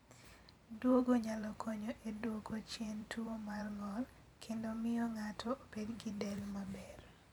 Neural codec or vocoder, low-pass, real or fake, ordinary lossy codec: vocoder, 44.1 kHz, 128 mel bands every 512 samples, BigVGAN v2; 19.8 kHz; fake; none